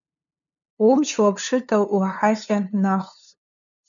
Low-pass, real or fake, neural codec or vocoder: 7.2 kHz; fake; codec, 16 kHz, 2 kbps, FunCodec, trained on LibriTTS, 25 frames a second